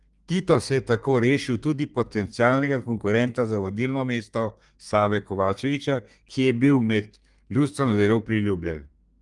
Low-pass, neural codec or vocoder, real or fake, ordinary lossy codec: 10.8 kHz; codec, 32 kHz, 1.9 kbps, SNAC; fake; Opus, 32 kbps